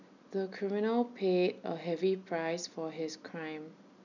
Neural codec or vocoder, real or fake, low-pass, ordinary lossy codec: none; real; 7.2 kHz; none